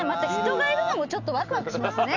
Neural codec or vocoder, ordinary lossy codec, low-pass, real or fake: none; none; 7.2 kHz; real